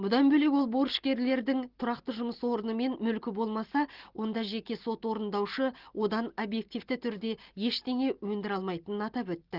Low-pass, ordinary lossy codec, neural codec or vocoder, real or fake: 5.4 kHz; Opus, 16 kbps; none; real